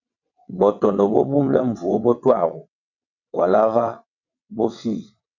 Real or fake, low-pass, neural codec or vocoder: fake; 7.2 kHz; vocoder, 22.05 kHz, 80 mel bands, WaveNeXt